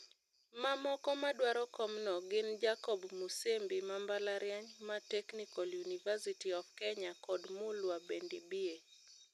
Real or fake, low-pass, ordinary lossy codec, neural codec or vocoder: real; 14.4 kHz; none; none